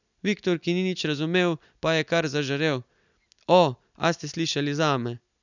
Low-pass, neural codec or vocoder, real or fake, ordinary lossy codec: 7.2 kHz; none; real; none